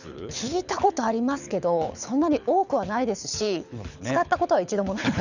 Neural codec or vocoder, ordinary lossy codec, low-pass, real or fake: codec, 24 kHz, 6 kbps, HILCodec; none; 7.2 kHz; fake